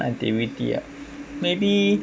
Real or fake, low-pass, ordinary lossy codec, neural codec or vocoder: real; none; none; none